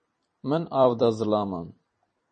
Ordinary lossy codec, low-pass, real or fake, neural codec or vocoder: MP3, 32 kbps; 10.8 kHz; fake; vocoder, 44.1 kHz, 128 mel bands every 256 samples, BigVGAN v2